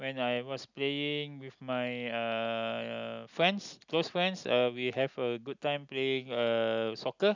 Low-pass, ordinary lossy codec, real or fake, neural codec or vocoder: 7.2 kHz; none; real; none